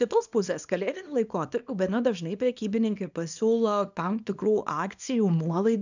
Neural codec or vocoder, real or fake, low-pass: codec, 24 kHz, 0.9 kbps, WavTokenizer, small release; fake; 7.2 kHz